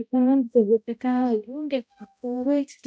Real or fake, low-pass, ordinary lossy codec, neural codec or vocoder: fake; none; none; codec, 16 kHz, 0.5 kbps, X-Codec, HuBERT features, trained on balanced general audio